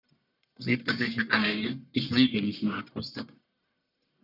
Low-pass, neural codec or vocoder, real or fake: 5.4 kHz; codec, 44.1 kHz, 1.7 kbps, Pupu-Codec; fake